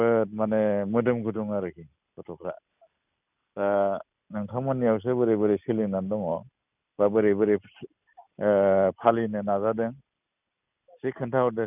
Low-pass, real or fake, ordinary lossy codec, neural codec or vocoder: 3.6 kHz; real; none; none